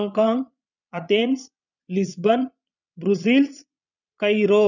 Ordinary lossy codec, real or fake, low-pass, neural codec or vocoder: none; fake; 7.2 kHz; vocoder, 22.05 kHz, 80 mel bands, Vocos